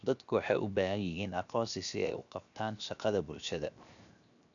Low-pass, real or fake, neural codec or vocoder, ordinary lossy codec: 7.2 kHz; fake; codec, 16 kHz, 0.7 kbps, FocalCodec; none